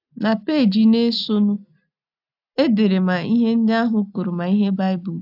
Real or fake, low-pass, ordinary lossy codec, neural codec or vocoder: real; 5.4 kHz; AAC, 48 kbps; none